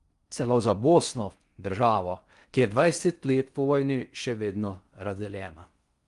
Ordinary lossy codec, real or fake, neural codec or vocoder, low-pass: Opus, 32 kbps; fake; codec, 16 kHz in and 24 kHz out, 0.6 kbps, FocalCodec, streaming, 4096 codes; 10.8 kHz